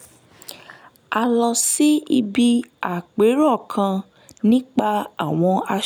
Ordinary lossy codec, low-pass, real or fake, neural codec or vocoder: none; none; real; none